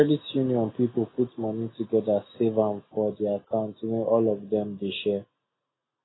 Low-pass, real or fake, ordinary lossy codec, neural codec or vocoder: 7.2 kHz; real; AAC, 16 kbps; none